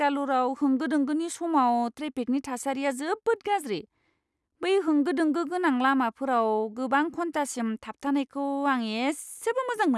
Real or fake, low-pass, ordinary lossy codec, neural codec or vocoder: real; none; none; none